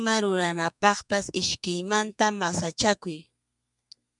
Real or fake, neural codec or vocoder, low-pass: fake; codec, 32 kHz, 1.9 kbps, SNAC; 10.8 kHz